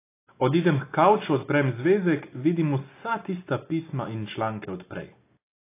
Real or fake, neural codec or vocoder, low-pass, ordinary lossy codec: real; none; 3.6 kHz; AAC, 24 kbps